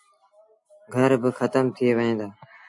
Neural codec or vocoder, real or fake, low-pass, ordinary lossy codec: none; real; 10.8 kHz; AAC, 64 kbps